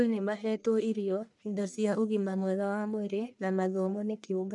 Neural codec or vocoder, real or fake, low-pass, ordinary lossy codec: codec, 44.1 kHz, 1.7 kbps, Pupu-Codec; fake; 10.8 kHz; none